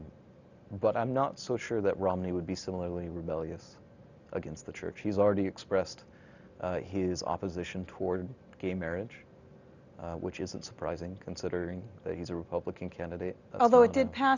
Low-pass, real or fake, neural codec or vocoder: 7.2 kHz; real; none